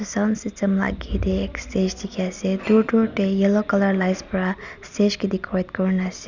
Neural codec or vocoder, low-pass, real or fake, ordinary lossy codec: none; 7.2 kHz; real; none